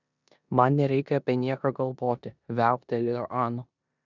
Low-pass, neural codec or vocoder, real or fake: 7.2 kHz; codec, 16 kHz in and 24 kHz out, 0.9 kbps, LongCat-Audio-Codec, four codebook decoder; fake